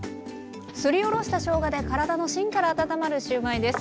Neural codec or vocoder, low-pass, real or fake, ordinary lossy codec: none; none; real; none